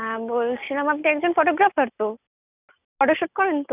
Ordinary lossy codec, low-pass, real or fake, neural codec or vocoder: none; 3.6 kHz; real; none